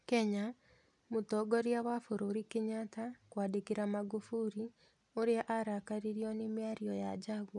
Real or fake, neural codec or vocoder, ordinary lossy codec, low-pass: real; none; none; 10.8 kHz